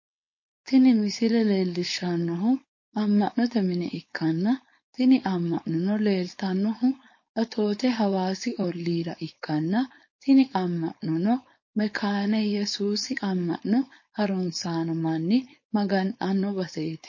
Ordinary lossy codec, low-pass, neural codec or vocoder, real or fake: MP3, 32 kbps; 7.2 kHz; codec, 16 kHz, 4.8 kbps, FACodec; fake